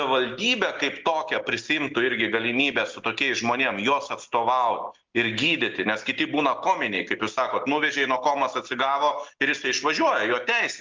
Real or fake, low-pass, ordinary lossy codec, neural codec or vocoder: real; 7.2 kHz; Opus, 32 kbps; none